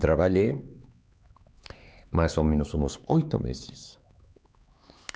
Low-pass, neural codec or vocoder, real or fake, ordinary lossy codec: none; codec, 16 kHz, 4 kbps, X-Codec, HuBERT features, trained on LibriSpeech; fake; none